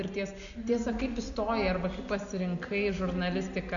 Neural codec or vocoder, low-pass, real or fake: none; 7.2 kHz; real